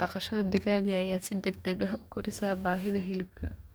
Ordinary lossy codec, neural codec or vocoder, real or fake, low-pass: none; codec, 44.1 kHz, 2.6 kbps, DAC; fake; none